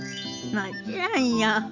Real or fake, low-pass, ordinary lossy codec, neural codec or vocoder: real; 7.2 kHz; MP3, 64 kbps; none